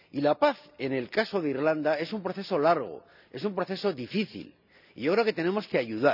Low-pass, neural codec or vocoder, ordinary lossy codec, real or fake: 5.4 kHz; none; none; real